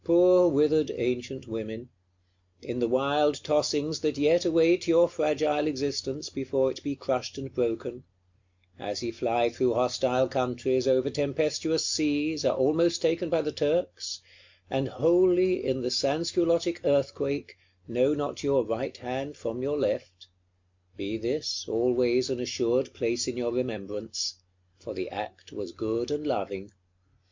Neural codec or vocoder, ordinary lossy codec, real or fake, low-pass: none; MP3, 64 kbps; real; 7.2 kHz